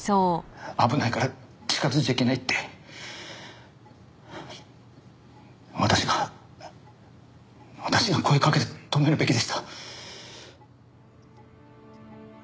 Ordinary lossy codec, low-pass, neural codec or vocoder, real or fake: none; none; none; real